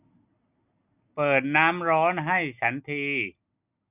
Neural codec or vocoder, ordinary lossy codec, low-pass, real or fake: none; none; 3.6 kHz; real